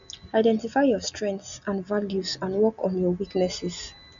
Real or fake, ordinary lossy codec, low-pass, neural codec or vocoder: real; none; 7.2 kHz; none